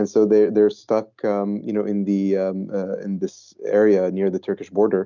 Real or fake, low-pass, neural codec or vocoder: real; 7.2 kHz; none